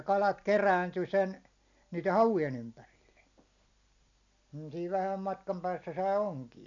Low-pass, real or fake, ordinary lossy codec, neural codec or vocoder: 7.2 kHz; real; none; none